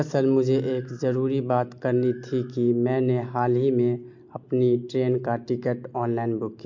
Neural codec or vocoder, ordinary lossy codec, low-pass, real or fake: none; MP3, 48 kbps; 7.2 kHz; real